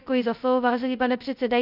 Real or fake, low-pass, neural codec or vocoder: fake; 5.4 kHz; codec, 16 kHz, 0.2 kbps, FocalCodec